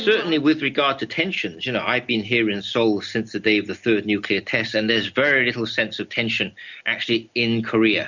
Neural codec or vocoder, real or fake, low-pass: none; real; 7.2 kHz